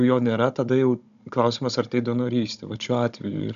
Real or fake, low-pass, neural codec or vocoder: fake; 7.2 kHz; codec, 16 kHz, 16 kbps, FunCodec, trained on Chinese and English, 50 frames a second